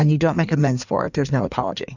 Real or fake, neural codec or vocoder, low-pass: fake; codec, 16 kHz in and 24 kHz out, 1.1 kbps, FireRedTTS-2 codec; 7.2 kHz